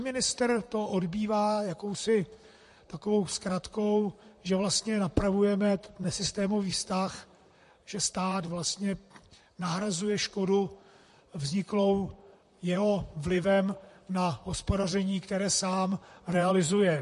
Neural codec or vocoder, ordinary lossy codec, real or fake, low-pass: vocoder, 44.1 kHz, 128 mel bands, Pupu-Vocoder; MP3, 48 kbps; fake; 14.4 kHz